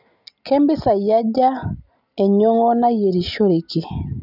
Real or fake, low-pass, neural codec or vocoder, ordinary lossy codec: real; 5.4 kHz; none; none